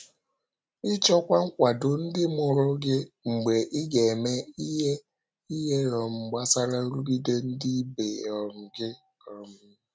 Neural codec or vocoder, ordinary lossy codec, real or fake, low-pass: none; none; real; none